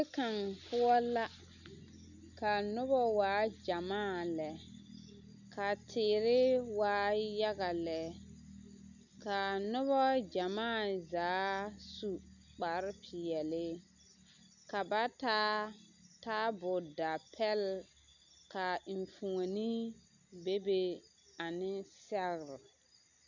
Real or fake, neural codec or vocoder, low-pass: real; none; 7.2 kHz